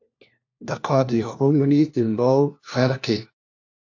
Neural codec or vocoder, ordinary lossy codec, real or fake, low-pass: codec, 16 kHz, 1 kbps, FunCodec, trained on LibriTTS, 50 frames a second; AAC, 48 kbps; fake; 7.2 kHz